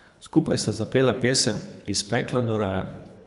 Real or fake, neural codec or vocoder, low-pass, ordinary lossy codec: fake; codec, 24 kHz, 3 kbps, HILCodec; 10.8 kHz; none